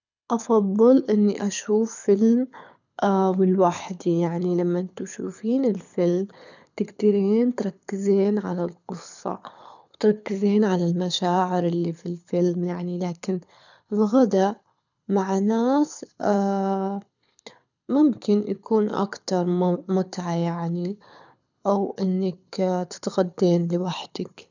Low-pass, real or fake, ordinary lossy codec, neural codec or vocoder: 7.2 kHz; fake; none; codec, 24 kHz, 6 kbps, HILCodec